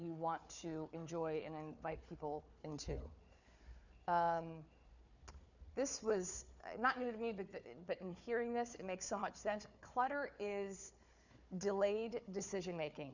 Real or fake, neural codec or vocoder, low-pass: fake; codec, 16 kHz, 4 kbps, FunCodec, trained on Chinese and English, 50 frames a second; 7.2 kHz